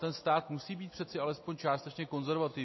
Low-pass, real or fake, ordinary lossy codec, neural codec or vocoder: 7.2 kHz; real; MP3, 24 kbps; none